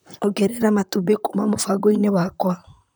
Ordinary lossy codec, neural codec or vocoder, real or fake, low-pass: none; vocoder, 44.1 kHz, 128 mel bands, Pupu-Vocoder; fake; none